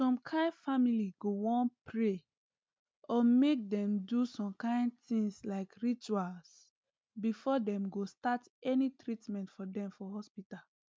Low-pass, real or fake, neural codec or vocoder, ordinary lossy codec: none; real; none; none